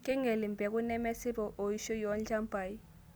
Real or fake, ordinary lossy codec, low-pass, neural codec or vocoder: real; none; none; none